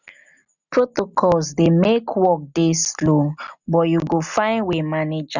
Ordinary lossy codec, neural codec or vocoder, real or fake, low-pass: none; none; real; 7.2 kHz